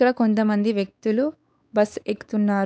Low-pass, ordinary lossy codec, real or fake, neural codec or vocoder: none; none; fake; codec, 16 kHz, 4 kbps, X-Codec, WavLM features, trained on Multilingual LibriSpeech